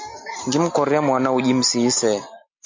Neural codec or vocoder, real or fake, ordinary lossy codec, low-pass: none; real; MP3, 48 kbps; 7.2 kHz